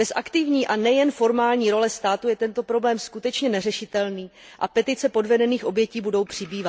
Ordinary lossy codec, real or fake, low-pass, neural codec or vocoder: none; real; none; none